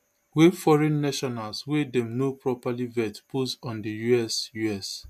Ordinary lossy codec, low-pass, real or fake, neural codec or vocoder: MP3, 96 kbps; 14.4 kHz; real; none